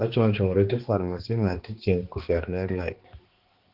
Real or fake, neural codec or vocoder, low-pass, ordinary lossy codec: fake; codec, 16 kHz, 2 kbps, X-Codec, HuBERT features, trained on balanced general audio; 5.4 kHz; Opus, 16 kbps